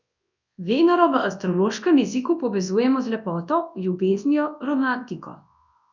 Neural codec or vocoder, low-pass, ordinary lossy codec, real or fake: codec, 24 kHz, 0.9 kbps, WavTokenizer, large speech release; 7.2 kHz; none; fake